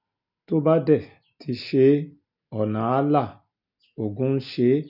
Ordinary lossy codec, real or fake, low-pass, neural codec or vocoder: none; real; 5.4 kHz; none